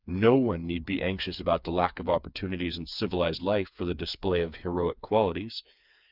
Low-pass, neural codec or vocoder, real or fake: 5.4 kHz; codec, 16 kHz, 4 kbps, FreqCodec, smaller model; fake